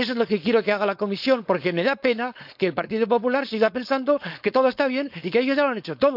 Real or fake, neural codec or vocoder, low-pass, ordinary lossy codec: fake; codec, 16 kHz, 4.8 kbps, FACodec; 5.4 kHz; none